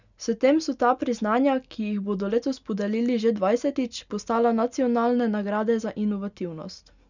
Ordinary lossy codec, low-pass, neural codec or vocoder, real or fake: none; 7.2 kHz; none; real